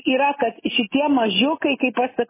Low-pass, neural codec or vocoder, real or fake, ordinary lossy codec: 3.6 kHz; none; real; MP3, 16 kbps